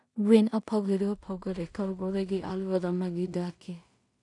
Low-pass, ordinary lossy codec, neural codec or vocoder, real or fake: 10.8 kHz; none; codec, 16 kHz in and 24 kHz out, 0.4 kbps, LongCat-Audio-Codec, two codebook decoder; fake